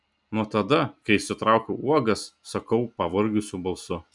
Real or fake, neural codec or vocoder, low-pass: real; none; 10.8 kHz